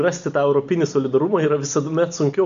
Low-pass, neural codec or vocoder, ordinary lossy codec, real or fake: 7.2 kHz; none; AAC, 96 kbps; real